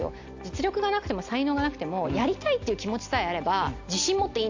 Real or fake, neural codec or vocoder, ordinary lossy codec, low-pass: real; none; AAC, 48 kbps; 7.2 kHz